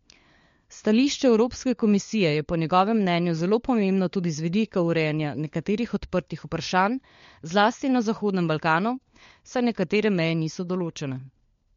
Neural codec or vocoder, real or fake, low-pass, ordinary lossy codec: codec, 16 kHz, 4 kbps, FunCodec, trained on LibriTTS, 50 frames a second; fake; 7.2 kHz; MP3, 48 kbps